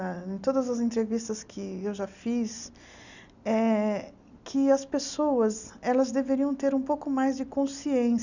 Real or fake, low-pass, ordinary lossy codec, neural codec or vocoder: real; 7.2 kHz; none; none